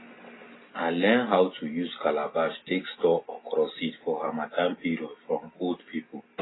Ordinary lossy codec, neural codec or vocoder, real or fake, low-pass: AAC, 16 kbps; none; real; 7.2 kHz